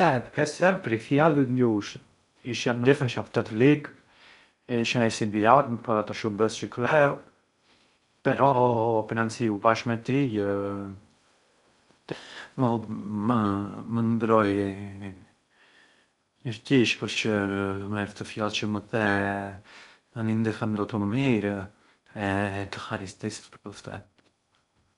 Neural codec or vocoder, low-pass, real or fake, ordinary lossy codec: codec, 16 kHz in and 24 kHz out, 0.6 kbps, FocalCodec, streaming, 2048 codes; 10.8 kHz; fake; none